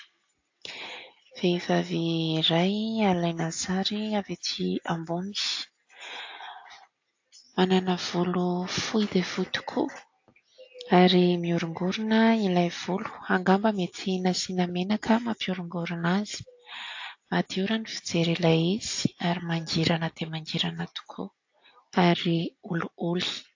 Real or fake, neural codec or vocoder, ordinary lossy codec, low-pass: real; none; AAC, 48 kbps; 7.2 kHz